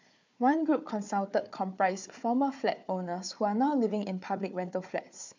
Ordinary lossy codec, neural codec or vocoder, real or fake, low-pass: MP3, 64 kbps; codec, 16 kHz, 4 kbps, FunCodec, trained on Chinese and English, 50 frames a second; fake; 7.2 kHz